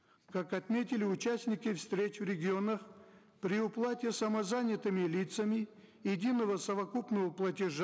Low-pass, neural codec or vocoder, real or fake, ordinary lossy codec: none; none; real; none